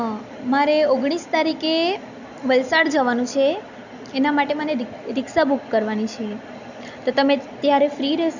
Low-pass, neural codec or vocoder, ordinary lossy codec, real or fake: 7.2 kHz; none; none; real